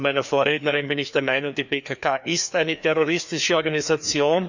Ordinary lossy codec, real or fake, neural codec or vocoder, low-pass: none; fake; codec, 16 kHz, 2 kbps, FreqCodec, larger model; 7.2 kHz